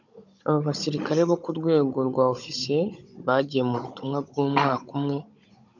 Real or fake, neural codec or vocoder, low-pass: fake; codec, 16 kHz, 16 kbps, FunCodec, trained on Chinese and English, 50 frames a second; 7.2 kHz